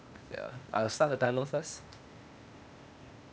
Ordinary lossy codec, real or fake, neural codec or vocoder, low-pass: none; fake; codec, 16 kHz, 0.8 kbps, ZipCodec; none